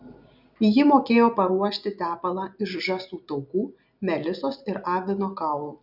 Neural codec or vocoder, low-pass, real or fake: none; 5.4 kHz; real